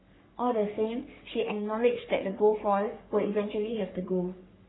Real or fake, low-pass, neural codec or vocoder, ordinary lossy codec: fake; 7.2 kHz; codec, 44.1 kHz, 3.4 kbps, Pupu-Codec; AAC, 16 kbps